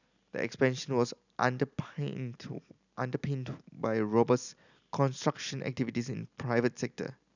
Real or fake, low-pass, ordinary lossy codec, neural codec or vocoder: real; 7.2 kHz; none; none